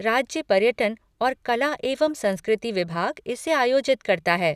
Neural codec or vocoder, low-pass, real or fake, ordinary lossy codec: none; 14.4 kHz; real; none